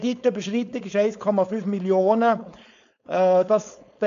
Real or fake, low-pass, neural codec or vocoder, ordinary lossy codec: fake; 7.2 kHz; codec, 16 kHz, 4.8 kbps, FACodec; none